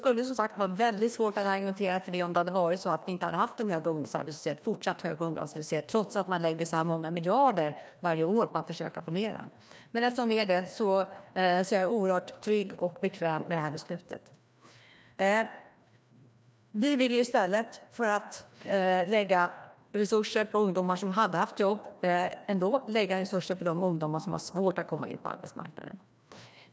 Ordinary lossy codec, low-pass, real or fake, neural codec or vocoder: none; none; fake; codec, 16 kHz, 1 kbps, FreqCodec, larger model